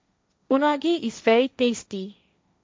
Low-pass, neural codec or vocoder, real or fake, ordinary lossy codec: none; codec, 16 kHz, 1.1 kbps, Voila-Tokenizer; fake; none